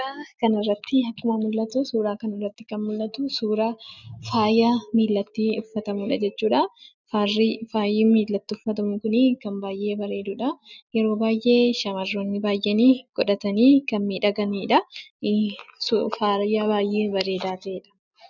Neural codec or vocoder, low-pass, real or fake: none; 7.2 kHz; real